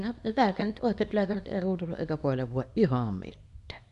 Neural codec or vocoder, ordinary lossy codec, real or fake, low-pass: codec, 24 kHz, 0.9 kbps, WavTokenizer, small release; none; fake; 10.8 kHz